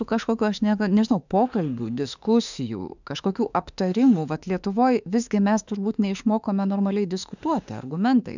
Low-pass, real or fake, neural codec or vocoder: 7.2 kHz; fake; autoencoder, 48 kHz, 32 numbers a frame, DAC-VAE, trained on Japanese speech